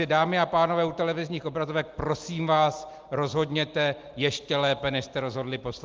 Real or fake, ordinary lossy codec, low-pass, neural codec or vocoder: real; Opus, 32 kbps; 7.2 kHz; none